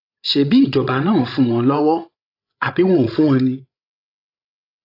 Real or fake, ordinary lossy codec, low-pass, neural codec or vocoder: fake; AAC, 32 kbps; 5.4 kHz; codec, 16 kHz, 16 kbps, FreqCodec, larger model